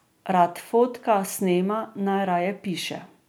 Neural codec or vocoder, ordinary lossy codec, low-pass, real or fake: none; none; none; real